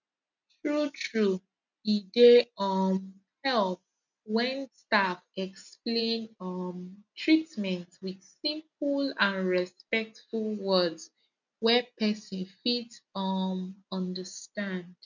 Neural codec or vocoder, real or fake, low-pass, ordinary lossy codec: none; real; 7.2 kHz; none